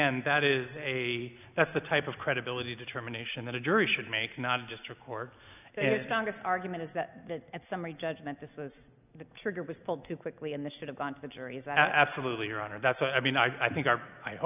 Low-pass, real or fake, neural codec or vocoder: 3.6 kHz; real; none